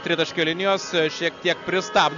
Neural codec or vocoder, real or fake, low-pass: none; real; 7.2 kHz